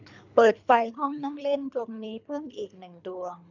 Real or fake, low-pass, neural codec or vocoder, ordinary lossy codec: fake; 7.2 kHz; codec, 24 kHz, 3 kbps, HILCodec; none